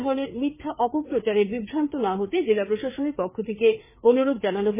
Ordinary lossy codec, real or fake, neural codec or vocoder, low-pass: MP3, 16 kbps; fake; codec, 16 kHz, 4 kbps, FreqCodec, larger model; 3.6 kHz